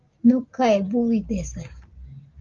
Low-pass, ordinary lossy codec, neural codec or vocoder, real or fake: 7.2 kHz; Opus, 32 kbps; none; real